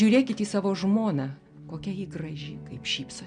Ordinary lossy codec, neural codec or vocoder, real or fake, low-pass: MP3, 96 kbps; none; real; 9.9 kHz